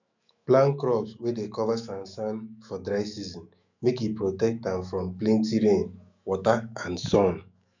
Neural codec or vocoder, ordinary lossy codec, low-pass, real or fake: autoencoder, 48 kHz, 128 numbers a frame, DAC-VAE, trained on Japanese speech; none; 7.2 kHz; fake